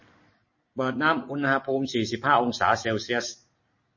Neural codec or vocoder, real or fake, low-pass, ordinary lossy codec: none; real; 7.2 kHz; MP3, 32 kbps